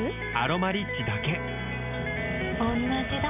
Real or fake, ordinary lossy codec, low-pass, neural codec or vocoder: real; none; 3.6 kHz; none